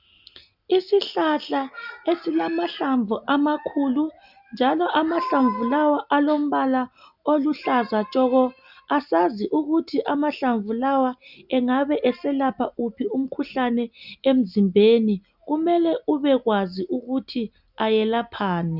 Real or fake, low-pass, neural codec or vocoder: real; 5.4 kHz; none